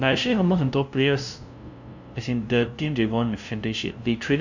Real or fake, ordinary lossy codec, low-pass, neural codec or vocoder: fake; none; 7.2 kHz; codec, 16 kHz, 0.5 kbps, FunCodec, trained on LibriTTS, 25 frames a second